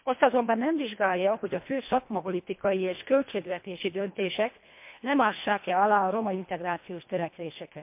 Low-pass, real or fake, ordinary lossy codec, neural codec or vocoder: 3.6 kHz; fake; MP3, 32 kbps; codec, 24 kHz, 1.5 kbps, HILCodec